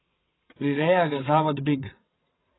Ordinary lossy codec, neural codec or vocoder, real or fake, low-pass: AAC, 16 kbps; vocoder, 22.05 kHz, 80 mel bands, WaveNeXt; fake; 7.2 kHz